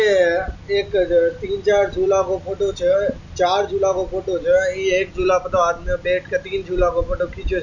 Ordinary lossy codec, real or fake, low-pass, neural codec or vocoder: none; real; 7.2 kHz; none